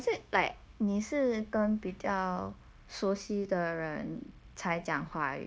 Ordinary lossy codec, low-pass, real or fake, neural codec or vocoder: none; none; fake; codec, 16 kHz, 0.9 kbps, LongCat-Audio-Codec